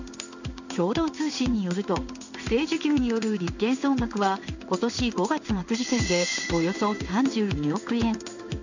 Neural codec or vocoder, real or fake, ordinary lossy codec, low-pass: codec, 16 kHz in and 24 kHz out, 1 kbps, XY-Tokenizer; fake; none; 7.2 kHz